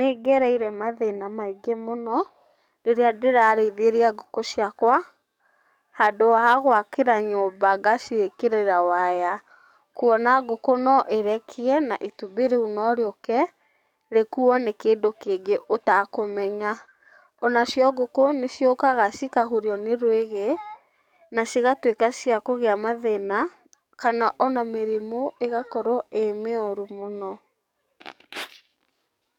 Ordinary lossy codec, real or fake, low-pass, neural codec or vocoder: none; fake; 19.8 kHz; codec, 44.1 kHz, 7.8 kbps, DAC